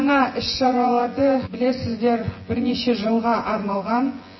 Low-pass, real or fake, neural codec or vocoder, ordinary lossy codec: 7.2 kHz; fake; vocoder, 24 kHz, 100 mel bands, Vocos; MP3, 24 kbps